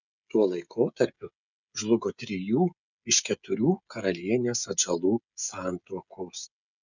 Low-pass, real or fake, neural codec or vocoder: 7.2 kHz; fake; codec, 16 kHz, 16 kbps, FreqCodec, smaller model